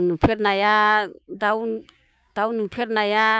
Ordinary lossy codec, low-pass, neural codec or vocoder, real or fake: none; none; codec, 16 kHz, 6 kbps, DAC; fake